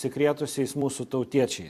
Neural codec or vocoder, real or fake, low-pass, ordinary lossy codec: vocoder, 44.1 kHz, 128 mel bands every 256 samples, BigVGAN v2; fake; 14.4 kHz; MP3, 64 kbps